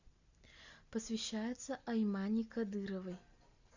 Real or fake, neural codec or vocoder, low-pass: real; none; 7.2 kHz